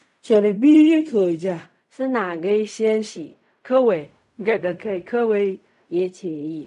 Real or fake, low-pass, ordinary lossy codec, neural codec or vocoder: fake; 10.8 kHz; none; codec, 16 kHz in and 24 kHz out, 0.4 kbps, LongCat-Audio-Codec, fine tuned four codebook decoder